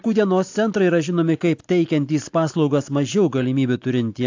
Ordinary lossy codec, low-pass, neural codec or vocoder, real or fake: AAC, 48 kbps; 7.2 kHz; none; real